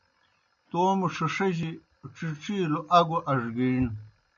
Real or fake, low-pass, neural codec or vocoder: real; 7.2 kHz; none